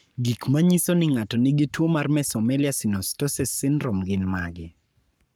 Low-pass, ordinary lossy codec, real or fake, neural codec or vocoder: none; none; fake; codec, 44.1 kHz, 7.8 kbps, Pupu-Codec